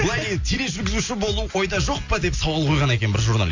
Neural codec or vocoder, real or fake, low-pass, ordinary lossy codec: none; real; 7.2 kHz; AAC, 48 kbps